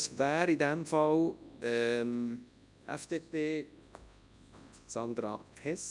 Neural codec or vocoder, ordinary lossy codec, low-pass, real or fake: codec, 24 kHz, 0.9 kbps, WavTokenizer, large speech release; none; 10.8 kHz; fake